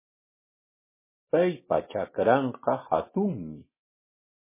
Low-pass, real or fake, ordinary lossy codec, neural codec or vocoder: 3.6 kHz; real; MP3, 16 kbps; none